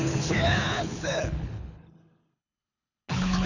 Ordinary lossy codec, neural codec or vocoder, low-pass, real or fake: none; codec, 24 kHz, 6 kbps, HILCodec; 7.2 kHz; fake